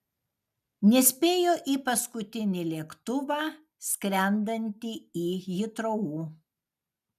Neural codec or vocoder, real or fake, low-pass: none; real; 14.4 kHz